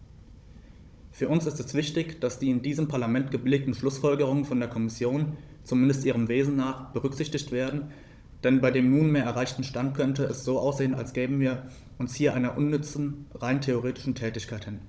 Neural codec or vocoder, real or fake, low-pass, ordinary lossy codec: codec, 16 kHz, 16 kbps, FunCodec, trained on Chinese and English, 50 frames a second; fake; none; none